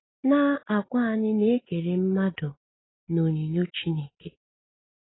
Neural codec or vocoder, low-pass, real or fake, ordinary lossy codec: none; 7.2 kHz; real; AAC, 16 kbps